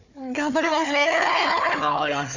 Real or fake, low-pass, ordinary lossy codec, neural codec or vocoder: fake; 7.2 kHz; none; codec, 16 kHz, 4 kbps, FunCodec, trained on Chinese and English, 50 frames a second